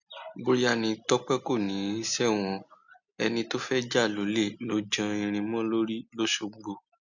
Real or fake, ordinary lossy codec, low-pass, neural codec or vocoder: real; none; 7.2 kHz; none